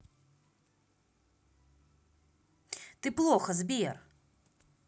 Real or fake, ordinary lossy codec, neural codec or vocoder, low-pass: real; none; none; none